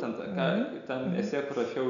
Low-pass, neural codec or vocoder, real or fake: 7.2 kHz; none; real